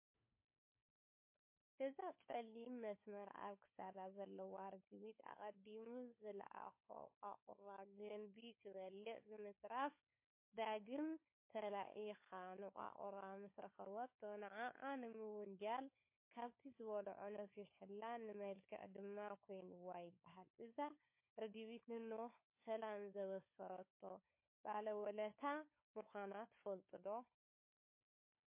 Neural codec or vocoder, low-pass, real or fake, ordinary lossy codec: codec, 16 kHz, 4 kbps, FunCodec, trained on LibriTTS, 50 frames a second; 3.6 kHz; fake; MP3, 24 kbps